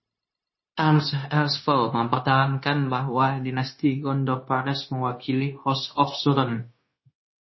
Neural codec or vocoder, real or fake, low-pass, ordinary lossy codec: codec, 16 kHz, 0.9 kbps, LongCat-Audio-Codec; fake; 7.2 kHz; MP3, 24 kbps